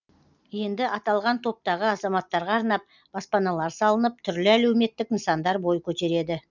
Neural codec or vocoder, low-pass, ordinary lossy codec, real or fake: none; 7.2 kHz; none; real